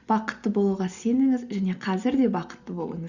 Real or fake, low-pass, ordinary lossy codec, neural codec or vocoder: real; 7.2 kHz; Opus, 64 kbps; none